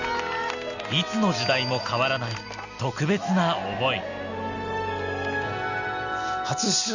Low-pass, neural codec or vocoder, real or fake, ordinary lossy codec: 7.2 kHz; none; real; none